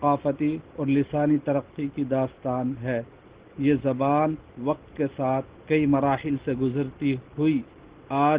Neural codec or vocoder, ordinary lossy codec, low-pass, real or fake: none; Opus, 16 kbps; 3.6 kHz; real